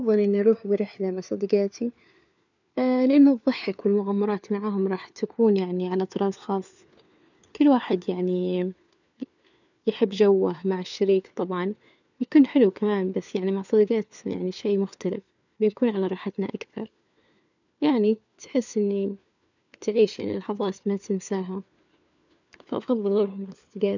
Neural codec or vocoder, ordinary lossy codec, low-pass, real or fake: codec, 16 kHz, 4 kbps, FunCodec, trained on LibriTTS, 50 frames a second; none; 7.2 kHz; fake